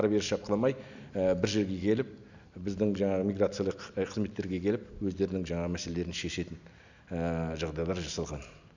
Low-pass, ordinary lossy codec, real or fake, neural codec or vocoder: 7.2 kHz; none; real; none